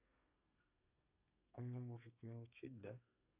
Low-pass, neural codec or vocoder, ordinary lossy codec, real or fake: 3.6 kHz; codec, 32 kHz, 1.9 kbps, SNAC; none; fake